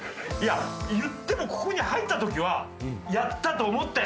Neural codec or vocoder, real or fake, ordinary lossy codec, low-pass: none; real; none; none